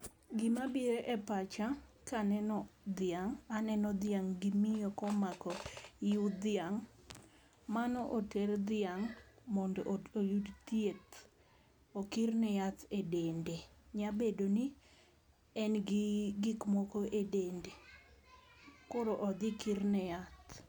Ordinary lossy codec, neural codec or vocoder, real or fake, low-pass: none; none; real; none